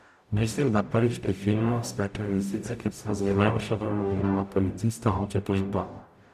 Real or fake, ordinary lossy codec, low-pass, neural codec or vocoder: fake; none; 14.4 kHz; codec, 44.1 kHz, 0.9 kbps, DAC